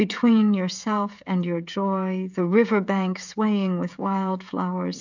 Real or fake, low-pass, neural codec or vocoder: fake; 7.2 kHz; codec, 16 kHz, 16 kbps, FreqCodec, smaller model